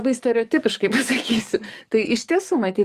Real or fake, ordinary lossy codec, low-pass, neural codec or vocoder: fake; Opus, 32 kbps; 14.4 kHz; codec, 44.1 kHz, 7.8 kbps, DAC